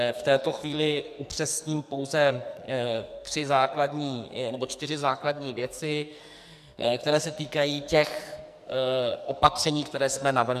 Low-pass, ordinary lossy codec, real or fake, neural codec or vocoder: 14.4 kHz; MP3, 96 kbps; fake; codec, 44.1 kHz, 2.6 kbps, SNAC